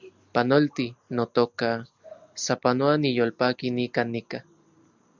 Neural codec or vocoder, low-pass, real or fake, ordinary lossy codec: none; 7.2 kHz; real; Opus, 64 kbps